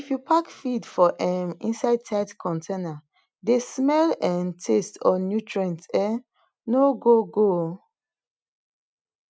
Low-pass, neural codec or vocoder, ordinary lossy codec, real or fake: none; none; none; real